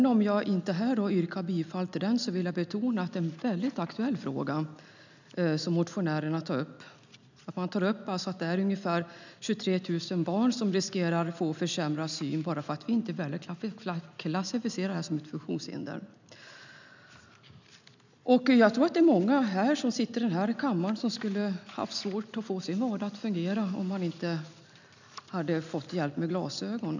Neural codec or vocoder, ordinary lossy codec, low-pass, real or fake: none; none; 7.2 kHz; real